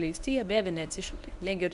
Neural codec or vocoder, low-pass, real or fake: codec, 24 kHz, 0.9 kbps, WavTokenizer, medium speech release version 1; 10.8 kHz; fake